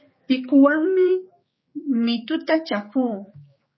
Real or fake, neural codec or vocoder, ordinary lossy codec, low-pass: fake; codec, 16 kHz, 4 kbps, X-Codec, HuBERT features, trained on general audio; MP3, 24 kbps; 7.2 kHz